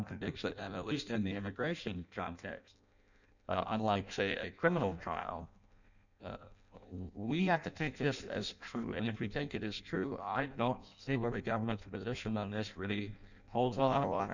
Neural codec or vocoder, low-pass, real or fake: codec, 16 kHz in and 24 kHz out, 0.6 kbps, FireRedTTS-2 codec; 7.2 kHz; fake